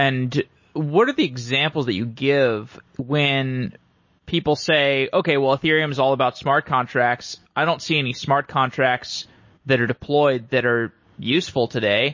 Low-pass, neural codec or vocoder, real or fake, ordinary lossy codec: 7.2 kHz; none; real; MP3, 32 kbps